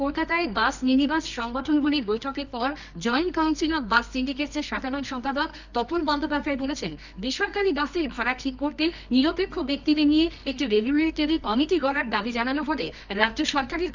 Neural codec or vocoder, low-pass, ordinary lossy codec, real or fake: codec, 24 kHz, 0.9 kbps, WavTokenizer, medium music audio release; 7.2 kHz; none; fake